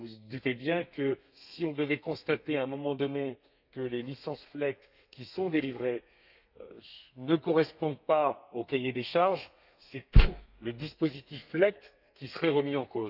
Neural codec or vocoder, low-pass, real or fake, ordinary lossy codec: codec, 32 kHz, 1.9 kbps, SNAC; 5.4 kHz; fake; none